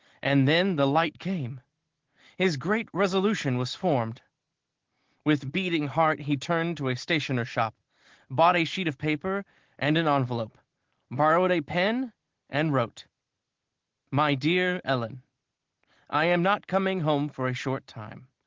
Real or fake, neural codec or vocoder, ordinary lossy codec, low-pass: real; none; Opus, 16 kbps; 7.2 kHz